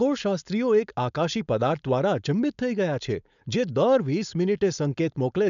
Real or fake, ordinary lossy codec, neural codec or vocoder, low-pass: fake; none; codec, 16 kHz, 4.8 kbps, FACodec; 7.2 kHz